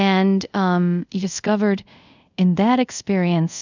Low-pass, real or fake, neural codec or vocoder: 7.2 kHz; fake; codec, 24 kHz, 0.5 kbps, DualCodec